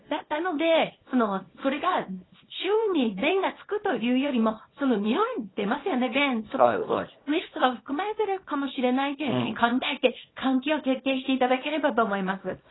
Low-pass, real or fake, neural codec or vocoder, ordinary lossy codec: 7.2 kHz; fake; codec, 24 kHz, 0.9 kbps, WavTokenizer, small release; AAC, 16 kbps